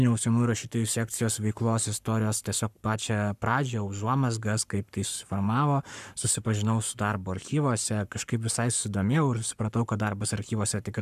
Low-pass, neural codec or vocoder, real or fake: 14.4 kHz; codec, 44.1 kHz, 7.8 kbps, Pupu-Codec; fake